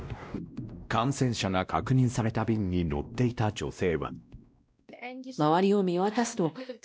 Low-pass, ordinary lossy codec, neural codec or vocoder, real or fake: none; none; codec, 16 kHz, 1 kbps, X-Codec, WavLM features, trained on Multilingual LibriSpeech; fake